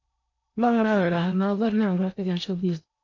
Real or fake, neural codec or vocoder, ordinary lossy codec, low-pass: fake; codec, 16 kHz in and 24 kHz out, 0.8 kbps, FocalCodec, streaming, 65536 codes; MP3, 48 kbps; 7.2 kHz